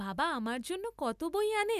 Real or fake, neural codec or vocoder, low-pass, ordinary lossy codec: real; none; 14.4 kHz; none